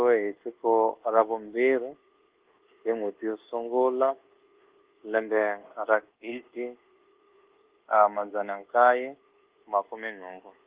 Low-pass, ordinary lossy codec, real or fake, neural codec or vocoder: 3.6 kHz; Opus, 16 kbps; fake; codec, 24 kHz, 1.2 kbps, DualCodec